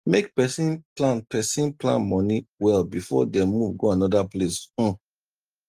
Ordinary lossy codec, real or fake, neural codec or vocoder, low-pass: Opus, 24 kbps; real; none; 14.4 kHz